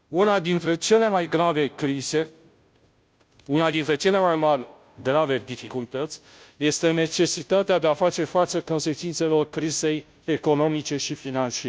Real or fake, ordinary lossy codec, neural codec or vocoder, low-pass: fake; none; codec, 16 kHz, 0.5 kbps, FunCodec, trained on Chinese and English, 25 frames a second; none